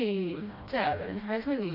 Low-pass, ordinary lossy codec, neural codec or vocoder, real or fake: 5.4 kHz; none; codec, 16 kHz, 1 kbps, FreqCodec, smaller model; fake